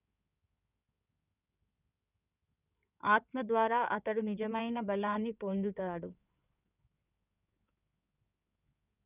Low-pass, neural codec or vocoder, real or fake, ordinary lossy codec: 3.6 kHz; codec, 16 kHz in and 24 kHz out, 2.2 kbps, FireRedTTS-2 codec; fake; none